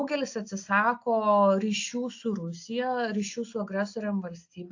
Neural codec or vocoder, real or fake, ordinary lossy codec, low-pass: none; real; AAC, 48 kbps; 7.2 kHz